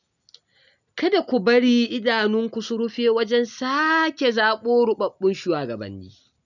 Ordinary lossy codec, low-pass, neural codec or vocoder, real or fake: none; 7.2 kHz; none; real